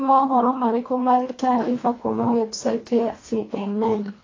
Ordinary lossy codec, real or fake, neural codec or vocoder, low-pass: AAC, 32 kbps; fake; codec, 24 kHz, 1.5 kbps, HILCodec; 7.2 kHz